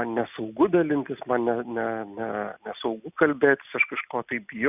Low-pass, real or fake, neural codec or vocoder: 3.6 kHz; real; none